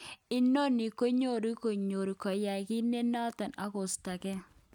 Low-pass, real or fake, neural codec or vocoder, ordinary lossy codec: 19.8 kHz; real; none; none